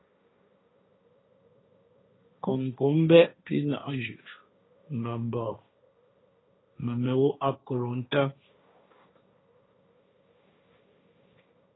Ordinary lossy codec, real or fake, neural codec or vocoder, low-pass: AAC, 16 kbps; fake; codec, 16 kHz, 1.1 kbps, Voila-Tokenizer; 7.2 kHz